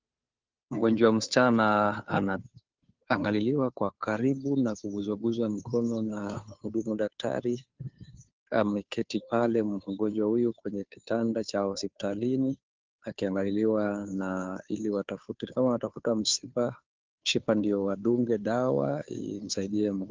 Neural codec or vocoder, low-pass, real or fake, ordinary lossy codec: codec, 16 kHz, 2 kbps, FunCodec, trained on Chinese and English, 25 frames a second; 7.2 kHz; fake; Opus, 32 kbps